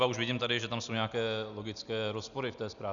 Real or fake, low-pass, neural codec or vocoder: real; 7.2 kHz; none